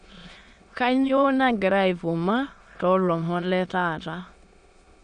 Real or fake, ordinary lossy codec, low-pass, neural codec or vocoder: fake; none; 9.9 kHz; autoencoder, 22.05 kHz, a latent of 192 numbers a frame, VITS, trained on many speakers